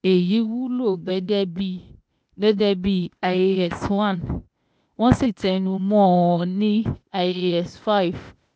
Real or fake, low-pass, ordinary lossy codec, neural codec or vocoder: fake; none; none; codec, 16 kHz, 0.8 kbps, ZipCodec